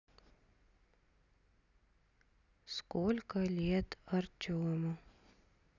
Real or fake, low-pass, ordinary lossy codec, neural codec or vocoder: real; 7.2 kHz; none; none